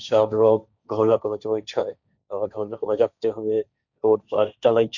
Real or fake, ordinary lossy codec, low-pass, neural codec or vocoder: fake; none; 7.2 kHz; codec, 16 kHz, 1.1 kbps, Voila-Tokenizer